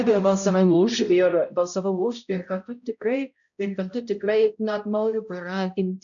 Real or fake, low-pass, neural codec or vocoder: fake; 7.2 kHz; codec, 16 kHz, 0.5 kbps, X-Codec, HuBERT features, trained on balanced general audio